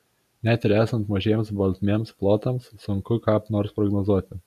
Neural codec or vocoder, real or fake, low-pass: none; real; 14.4 kHz